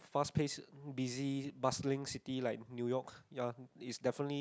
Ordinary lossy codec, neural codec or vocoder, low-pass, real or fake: none; none; none; real